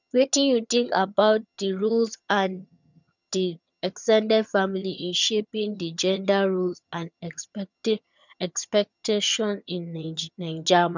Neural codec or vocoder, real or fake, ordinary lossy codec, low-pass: vocoder, 22.05 kHz, 80 mel bands, HiFi-GAN; fake; none; 7.2 kHz